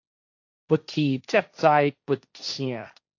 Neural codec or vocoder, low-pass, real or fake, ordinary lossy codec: codec, 16 kHz, 1.1 kbps, Voila-Tokenizer; 7.2 kHz; fake; AAC, 48 kbps